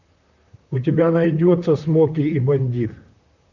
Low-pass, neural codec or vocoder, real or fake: 7.2 kHz; vocoder, 44.1 kHz, 128 mel bands, Pupu-Vocoder; fake